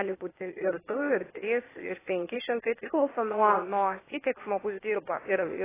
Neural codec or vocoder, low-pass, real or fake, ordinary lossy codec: codec, 16 kHz, 0.8 kbps, ZipCodec; 3.6 kHz; fake; AAC, 16 kbps